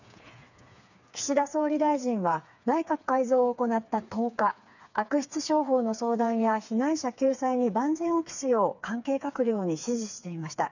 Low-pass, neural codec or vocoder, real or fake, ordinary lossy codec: 7.2 kHz; codec, 16 kHz, 4 kbps, FreqCodec, smaller model; fake; none